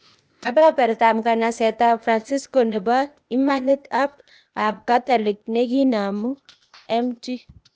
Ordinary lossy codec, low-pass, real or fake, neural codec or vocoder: none; none; fake; codec, 16 kHz, 0.8 kbps, ZipCodec